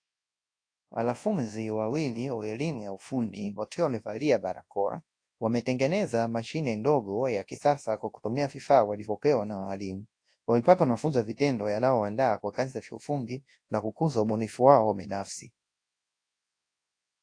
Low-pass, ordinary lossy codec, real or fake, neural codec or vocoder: 9.9 kHz; AAC, 48 kbps; fake; codec, 24 kHz, 0.9 kbps, WavTokenizer, large speech release